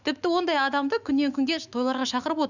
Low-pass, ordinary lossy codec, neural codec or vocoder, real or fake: 7.2 kHz; none; autoencoder, 48 kHz, 128 numbers a frame, DAC-VAE, trained on Japanese speech; fake